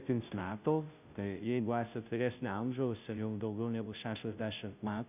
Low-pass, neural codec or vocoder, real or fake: 3.6 kHz; codec, 16 kHz, 0.5 kbps, FunCodec, trained on Chinese and English, 25 frames a second; fake